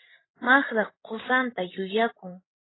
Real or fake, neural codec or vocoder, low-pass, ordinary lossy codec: fake; codec, 16 kHz, 16 kbps, FreqCodec, larger model; 7.2 kHz; AAC, 16 kbps